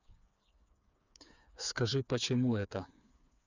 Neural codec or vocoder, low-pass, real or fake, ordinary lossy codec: codec, 16 kHz, 4 kbps, FreqCodec, smaller model; 7.2 kHz; fake; none